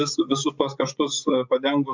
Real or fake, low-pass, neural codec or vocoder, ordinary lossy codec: fake; 7.2 kHz; vocoder, 24 kHz, 100 mel bands, Vocos; MP3, 64 kbps